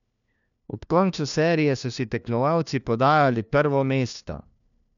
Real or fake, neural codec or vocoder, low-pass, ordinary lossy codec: fake; codec, 16 kHz, 1 kbps, FunCodec, trained on LibriTTS, 50 frames a second; 7.2 kHz; none